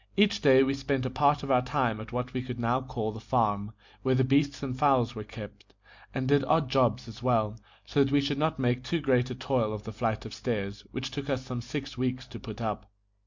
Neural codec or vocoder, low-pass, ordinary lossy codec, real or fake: none; 7.2 kHz; MP3, 64 kbps; real